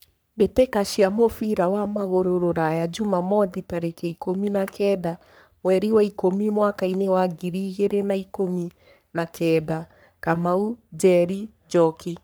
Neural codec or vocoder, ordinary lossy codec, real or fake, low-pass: codec, 44.1 kHz, 3.4 kbps, Pupu-Codec; none; fake; none